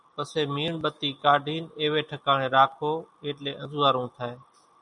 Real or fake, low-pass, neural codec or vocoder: real; 10.8 kHz; none